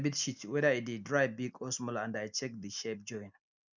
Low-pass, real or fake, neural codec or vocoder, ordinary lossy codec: 7.2 kHz; real; none; none